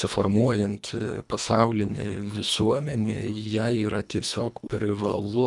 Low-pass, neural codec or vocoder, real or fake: 10.8 kHz; codec, 24 kHz, 1.5 kbps, HILCodec; fake